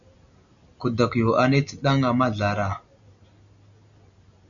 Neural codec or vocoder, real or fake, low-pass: none; real; 7.2 kHz